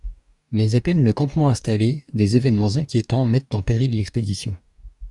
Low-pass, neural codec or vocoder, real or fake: 10.8 kHz; codec, 44.1 kHz, 2.6 kbps, DAC; fake